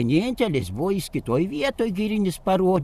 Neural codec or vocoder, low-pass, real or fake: vocoder, 44.1 kHz, 128 mel bands every 512 samples, BigVGAN v2; 14.4 kHz; fake